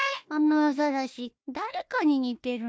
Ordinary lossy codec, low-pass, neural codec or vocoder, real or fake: none; none; codec, 16 kHz, 1 kbps, FunCodec, trained on Chinese and English, 50 frames a second; fake